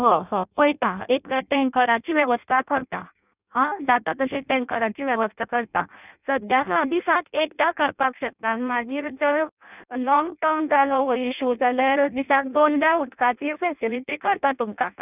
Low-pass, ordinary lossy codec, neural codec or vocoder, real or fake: 3.6 kHz; none; codec, 16 kHz in and 24 kHz out, 0.6 kbps, FireRedTTS-2 codec; fake